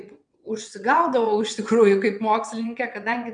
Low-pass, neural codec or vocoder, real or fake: 9.9 kHz; vocoder, 22.05 kHz, 80 mel bands, WaveNeXt; fake